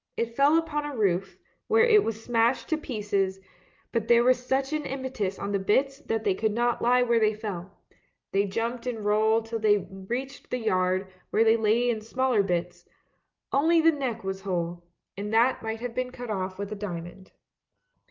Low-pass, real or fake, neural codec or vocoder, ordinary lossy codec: 7.2 kHz; real; none; Opus, 24 kbps